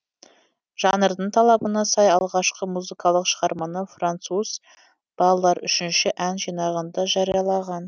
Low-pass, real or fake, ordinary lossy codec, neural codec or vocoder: none; real; none; none